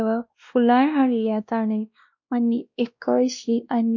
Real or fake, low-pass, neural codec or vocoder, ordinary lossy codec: fake; 7.2 kHz; codec, 16 kHz, 1 kbps, X-Codec, WavLM features, trained on Multilingual LibriSpeech; MP3, 48 kbps